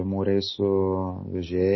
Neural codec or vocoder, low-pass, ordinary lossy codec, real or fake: none; 7.2 kHz; MP3, 24 kbps; real